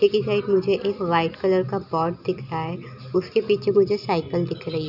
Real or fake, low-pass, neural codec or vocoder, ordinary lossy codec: real; 5.4 kHz; none; none